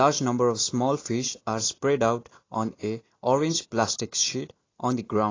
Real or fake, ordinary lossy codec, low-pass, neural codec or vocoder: real; AAC, 32 kbps; 7.2 kHz; none